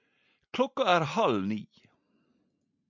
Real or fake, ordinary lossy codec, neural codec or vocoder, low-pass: real; MP3, 64 kbps; none; 7.2 kHz